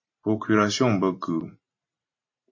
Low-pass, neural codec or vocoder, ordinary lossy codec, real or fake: 7.2 kHz; vocoder, 44.1 kHz, 128 mel bands every 512 samples, BigVGAN v2; MP3, 32 kbps; fake